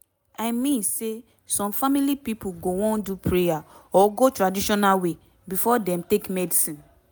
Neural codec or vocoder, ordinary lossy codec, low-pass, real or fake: none; none; none; real